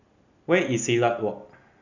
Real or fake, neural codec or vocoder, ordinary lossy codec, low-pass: real; none; none; 7.2 kHz